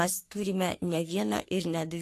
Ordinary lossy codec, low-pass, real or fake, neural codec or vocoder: AAC, 64 kbps; 14.4 kHz; fake; codec, 44.1 kHz, 3.4 kbps, Pupu-Codec